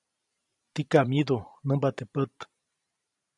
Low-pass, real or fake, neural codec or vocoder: 10.8 kHz; fake; vocoder, 44.1 kHz, 128 mel bands every 512 samples, BigVGAN v2